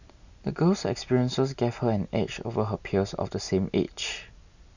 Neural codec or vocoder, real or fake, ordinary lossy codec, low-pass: none; real; none; 7.2 kHz